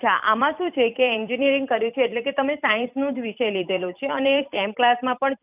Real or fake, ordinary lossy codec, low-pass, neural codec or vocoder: fake; none; 3.6 kHz; vocoder, 44.1 kHz, 128 mel bands every 256 samples, BigVGAN v2